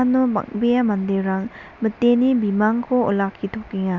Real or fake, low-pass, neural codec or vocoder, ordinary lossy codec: real; 7.2 kHz; none; none